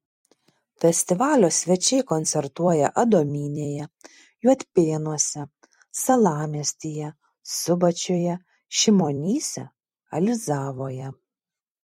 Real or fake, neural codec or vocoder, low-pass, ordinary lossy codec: fake; vocoder, 44.1 kHz, 128 mel bands every 256 samples, BigVGAN v2; 19.8 kHz; MP3, 64 kbps